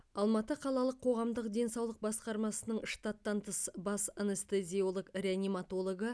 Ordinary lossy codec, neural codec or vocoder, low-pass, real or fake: none; none; none; real